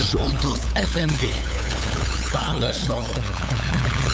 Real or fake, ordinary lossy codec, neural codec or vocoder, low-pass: fake; none; codec, 16 kHz, 8 kbps, FunCodec, trained on LibriTTS, 25 frames a second; none